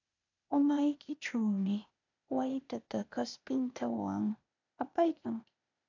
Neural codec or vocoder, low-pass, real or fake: codec, 16 kHz, 0.8 kbps, ZipCodec; 7.2 kHz; fake